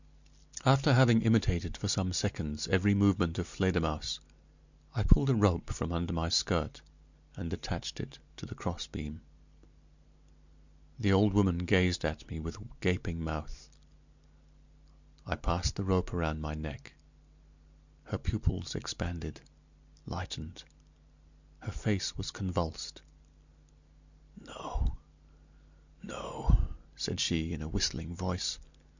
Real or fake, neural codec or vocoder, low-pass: real; none; 7.2 kHz